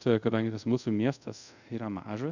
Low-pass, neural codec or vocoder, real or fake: 7.2 kHz; codec, 24 kHz, 0.5 kbps, DualCodec; fake